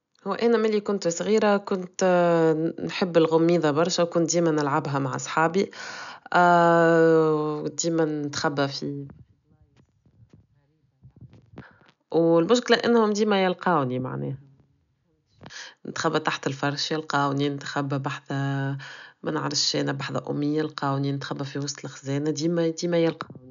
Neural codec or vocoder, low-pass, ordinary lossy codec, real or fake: none; 7.2 kHz; MP3, 96 kbps; real